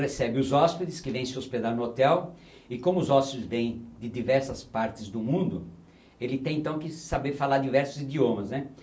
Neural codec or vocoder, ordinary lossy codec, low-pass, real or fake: none; none; none; real